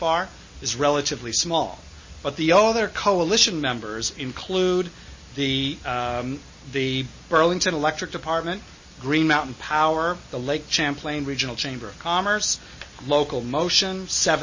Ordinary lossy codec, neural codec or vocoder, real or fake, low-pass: MP3, 32 kbps; none; real; 7.2 kHz